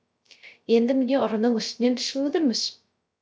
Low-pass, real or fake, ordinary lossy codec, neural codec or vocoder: none; fake; none; codec, 16 kHz, 0.3 kbps, FocalCodec